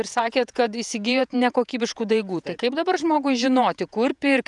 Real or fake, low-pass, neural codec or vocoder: fake; 10.8 kHz; vocoder, 48 kHz, 128 mel bands, Vocos